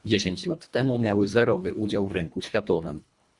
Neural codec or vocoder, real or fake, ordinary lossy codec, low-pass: codec, 24 kHz, 1.5 kbps, HILCodec; fake; Opus, 64 kbps; 10.8 kHz